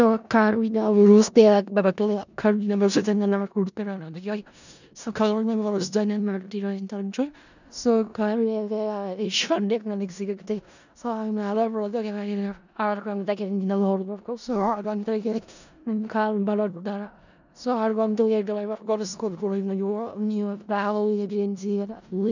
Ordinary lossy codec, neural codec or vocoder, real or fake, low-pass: none; codec, 16 kHz in and 24 kHz out, 0.4 kbps, LongCat-Audio-Codec, four codebook decoder; fake; 7.2 kHz